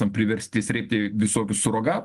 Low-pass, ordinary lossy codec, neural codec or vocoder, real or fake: 10.8 kHz; Opus, 24 kbps; none; real